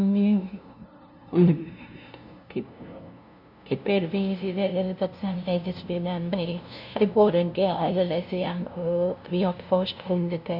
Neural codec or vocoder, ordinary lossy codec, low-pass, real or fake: codec, 16 kHz, 0.5 kbps, FunCodec, trained on LibriTTS, 25 frames a second; none; 5.4 kHz; fake